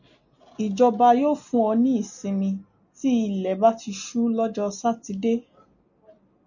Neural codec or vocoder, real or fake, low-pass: none; real; 7.2 kHz